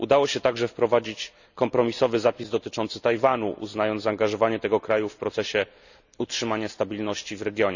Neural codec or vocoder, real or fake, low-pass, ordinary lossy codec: none; real; 7.2 kHz; none